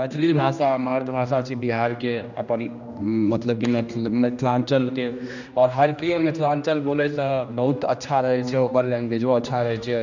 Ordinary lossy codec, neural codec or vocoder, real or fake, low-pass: none; codec, 16 kHz, 1 kbps, X-Codec, HuBERT features, trained on general audio; fake; 7.2 kHz